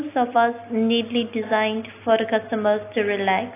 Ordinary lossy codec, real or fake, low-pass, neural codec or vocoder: AAC, 24 kbps; real; 3.6 kHz; none